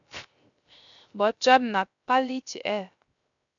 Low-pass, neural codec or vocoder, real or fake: 7.2 kHz; codec, 16 kHz, 0.3 kbps, FocalCodec; fake